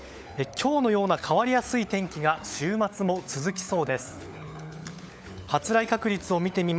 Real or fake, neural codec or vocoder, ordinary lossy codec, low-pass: fake; codec, 16 kHz, 16 kbps, FunCodec, trained on LibriTTS, 50 frames a second; none; none